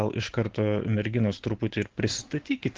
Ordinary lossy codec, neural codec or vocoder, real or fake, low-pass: Opus, 16 kbps; none; real; 7.2 kHz